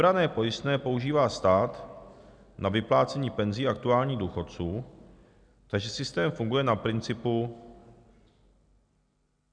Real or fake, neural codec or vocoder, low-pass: real; none; 9.9 kHz